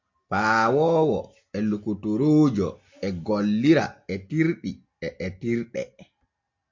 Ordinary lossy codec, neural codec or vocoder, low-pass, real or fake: MP3, 48 kbps; none; 7.2 kHz; real